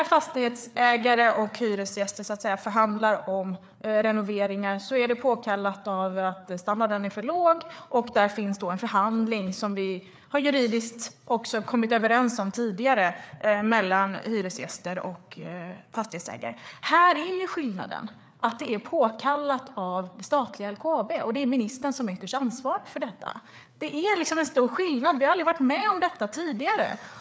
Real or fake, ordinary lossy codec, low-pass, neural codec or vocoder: fake; none; none; codec, 16 kHz, 4 kbps, FreqCodec, larger model